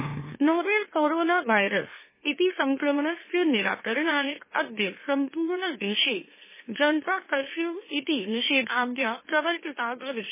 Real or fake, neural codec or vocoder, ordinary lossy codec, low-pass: fake; autoencoder, 44.1 kHz, a latent of 192 numbers a frame, MeloTTS; MP3, 16 kbps; 3.6 kHz